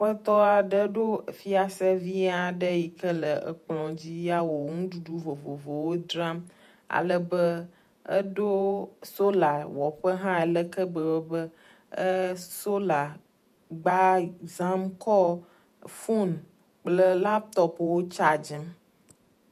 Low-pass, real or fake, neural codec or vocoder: 14.4 kHz; fake; vocoder, 48 kHz, 128 mel bands, Vocos